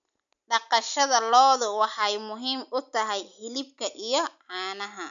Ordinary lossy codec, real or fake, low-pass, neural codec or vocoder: none; real; 7.2 kHz; none